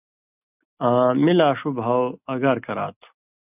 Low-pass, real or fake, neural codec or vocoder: 3.6 kHz; real; none